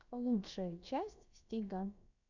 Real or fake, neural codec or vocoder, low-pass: fake; codec, 16 kHz, about 1 kbps, DyCAST, with the encoder's durations; 7.2 kHz